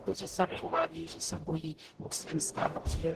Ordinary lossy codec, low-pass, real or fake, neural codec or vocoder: Opus, 16 kbps; 14.4 kHz; fake; codec, 44.1 kHz, 0.9 kbps, DAC